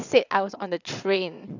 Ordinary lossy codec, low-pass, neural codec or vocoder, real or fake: none; 7.2 kHz; vocoder, 22.05 kHz, 80 mel bands, WaveNeXt; fake